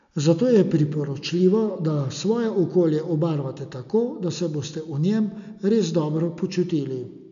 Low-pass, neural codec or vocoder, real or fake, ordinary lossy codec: 7.2 kHz; none; real; none